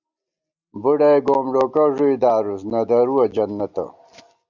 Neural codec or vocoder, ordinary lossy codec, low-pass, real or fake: none; Opus, 64 kbps; 7.2 kHz; real